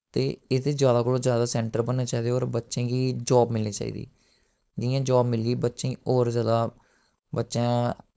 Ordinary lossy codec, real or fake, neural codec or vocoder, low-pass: none; fake; codec, 16 kHz, 4.8 kbps, FACodec; none